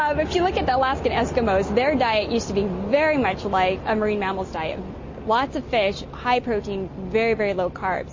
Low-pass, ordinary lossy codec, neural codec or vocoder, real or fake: 7.2 kHz; MP3, 32 kbps; none; real